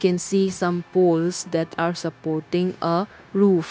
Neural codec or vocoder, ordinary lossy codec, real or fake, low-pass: codec, 16 kHz, 0.9 kbps, LongCat-Audio-Codec; none; fake; none